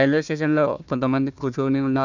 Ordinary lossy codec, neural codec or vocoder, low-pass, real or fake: none; codec, 16 kHz, 1 kbps, FunCodec, trained on Chinese and English, 50 frames a second; 7.2 kHz; fake